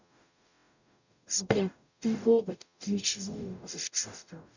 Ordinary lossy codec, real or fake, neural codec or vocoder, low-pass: none; fake; codec, 44.1 kHz, 0.9 kbps, DAC; 7.2 kHz